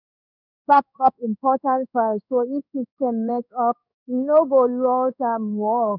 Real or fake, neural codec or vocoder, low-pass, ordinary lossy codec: fake; codec, 16 kHz in and 24 kHz out, 1 kbps, XY-Tokenizer; 5.4 kHz; none